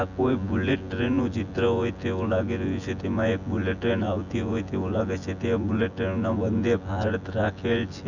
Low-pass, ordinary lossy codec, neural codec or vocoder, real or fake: 7.2 kHz; none; vocoder, 24 kHz, 100 mel bands, Vocos; fake